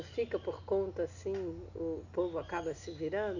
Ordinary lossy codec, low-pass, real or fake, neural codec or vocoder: none; 7.2 kHz; real; none